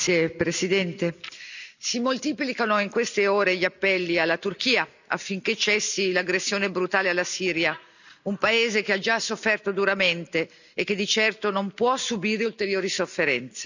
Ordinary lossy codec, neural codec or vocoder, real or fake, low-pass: none; none; real; 7.2 kHz